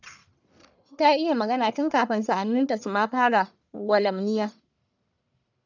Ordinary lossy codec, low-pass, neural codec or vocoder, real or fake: none; 7.2 kHz; codec, 44.1 kHz, 1.7 kbps, Pupu-Codec; fake